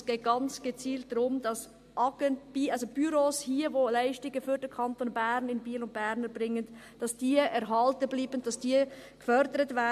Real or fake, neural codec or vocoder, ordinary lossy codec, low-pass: real; none; MP3, 64 kbps; 14.4 kHz